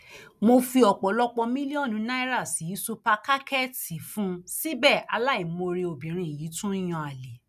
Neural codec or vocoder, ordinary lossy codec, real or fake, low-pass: none; none; real; 14.4 kHz